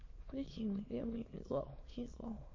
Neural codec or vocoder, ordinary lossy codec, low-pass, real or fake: autoencoder, 22.05 kHz, a latent of 192 numbers a frame, VITS, trained on many speakers; MP3, 32 kbps; 7.2 kHz; fake